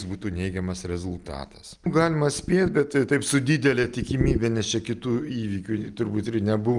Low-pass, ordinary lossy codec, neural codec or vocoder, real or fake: 10.8 kHz; Opus, 32 kbps; none; real